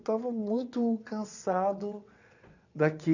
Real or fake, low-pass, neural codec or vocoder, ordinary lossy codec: fake; 7.2 kHz; vocoder, 22.05 kHz, 80 mel bands, WaveNeXt; none